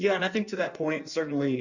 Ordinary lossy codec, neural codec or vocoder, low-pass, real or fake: Opus, 64 kbps; codec, 16 kHz in and 24 kHz out, 1.1 kbps, FireRedTTS-2 codec; 7.2 kHz; fake